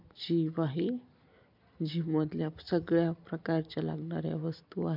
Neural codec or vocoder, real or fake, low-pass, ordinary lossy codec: none; real; 5.4 kHz; MP3, 48 kbps